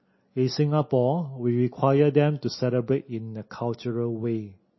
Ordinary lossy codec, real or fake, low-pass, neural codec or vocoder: MP3, 24 kbps; real; 7.2 kHz; none